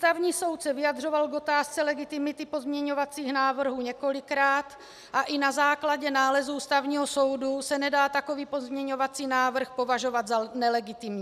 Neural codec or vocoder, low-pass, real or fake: none; 14.4 kHz; real